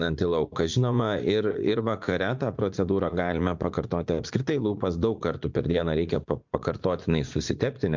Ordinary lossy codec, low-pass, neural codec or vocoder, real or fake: MP3, 64 kbps; 7.2 kHz; vocoder, 44.1 kHz, 80 mel bands, Vocos; fake